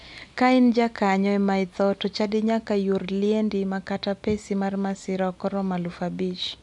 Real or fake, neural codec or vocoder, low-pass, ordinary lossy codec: real; none; 10.8 kHz; none